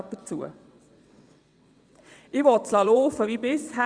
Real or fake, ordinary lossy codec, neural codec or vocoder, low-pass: fake; Opus, 64 kbps; vocoder, 22.05 kHz, 80 mel bands, WaveNeXt; 9.9 kHz